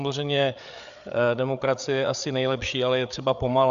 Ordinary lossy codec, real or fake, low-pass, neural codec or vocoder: Opus, 64 kbps; fake; 7.2 kHz; codec, 16 kHz, 8 kbps, FreqCodec, larger model